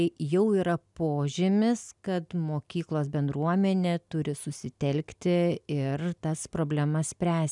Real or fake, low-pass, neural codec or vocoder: real; 10.8 kHz; none